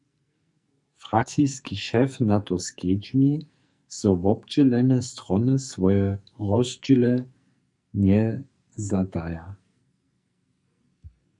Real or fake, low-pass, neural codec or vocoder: fake; 10.8 kHz; codec, 44.1 kHz, 2.6 kbps, SNAC